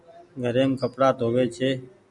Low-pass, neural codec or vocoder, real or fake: 10.8 kHz; none; real